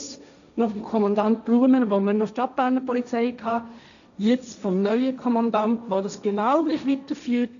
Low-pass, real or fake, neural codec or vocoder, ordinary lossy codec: 7.2 kHz; fake; codec, 16 kHz, 1.1 kbps, Voila-Tokenizer; none